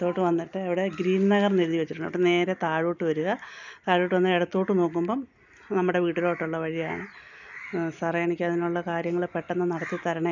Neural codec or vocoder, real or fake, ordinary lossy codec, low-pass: none; real; none; 7.2 kHz